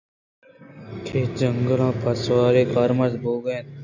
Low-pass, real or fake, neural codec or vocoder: 7.2 kHz; real; none